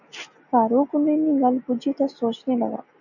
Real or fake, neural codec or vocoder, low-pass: real; none; 7.2 kHz